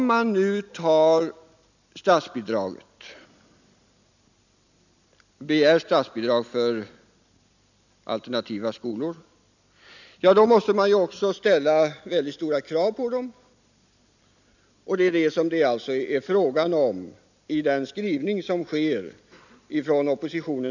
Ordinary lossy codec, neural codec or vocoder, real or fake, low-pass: none; none; real; 7.2 kHz